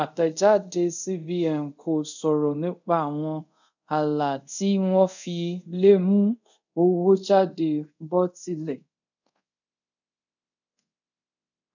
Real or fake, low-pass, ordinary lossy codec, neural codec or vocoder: fake; 7.2 kHz; none; codec, 24 kHz, 0.5 kbps, DualCodec